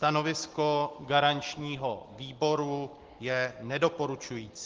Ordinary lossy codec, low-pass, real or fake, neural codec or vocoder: Opus, 16 kbps; 7.2 kHz; real; none